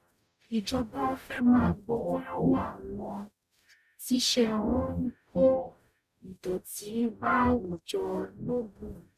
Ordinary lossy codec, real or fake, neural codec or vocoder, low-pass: none; fake; codec, 44.1 kHz, 0.9 kbps, DAC; 14.4 kHz